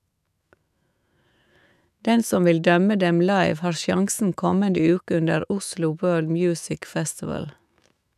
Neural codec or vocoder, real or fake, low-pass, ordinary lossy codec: autoencoder, 48 kHz, 128 numbers a frame, DAC-VAE, trained on Japanese speech; fake; 14.4 kHz; none